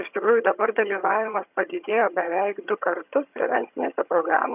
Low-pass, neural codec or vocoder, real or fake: 3.6 kHz; vocoder, 22.05 kHz, 80 mel bands, HiFi-GAN; fake